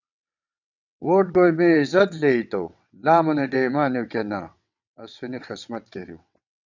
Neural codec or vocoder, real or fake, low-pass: vocoder, 22.05 kHz, 80 mel bands, WaveNeXt; fake; 7.2 kHz